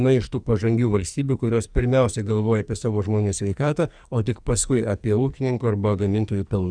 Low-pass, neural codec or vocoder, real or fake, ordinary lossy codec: 9.9 kHz; codec, 44.1 kHz, 2.6 kbps, SNAC; fake; Opus, 64 kbps